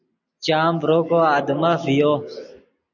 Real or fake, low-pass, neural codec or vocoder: real; 7.2 kHz; none